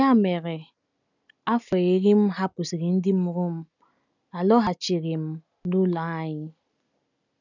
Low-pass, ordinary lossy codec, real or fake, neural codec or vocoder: 7.2 kHz; none; real; none